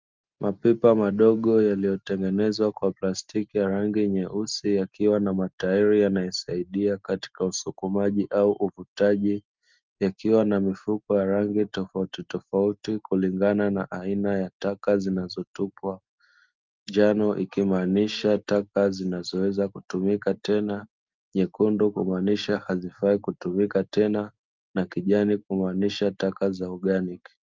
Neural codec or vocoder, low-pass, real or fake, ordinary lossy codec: none; 7.2 kHz; real; Opus, 24 kbps